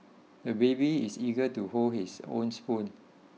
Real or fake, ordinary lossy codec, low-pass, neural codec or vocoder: real; none; none; none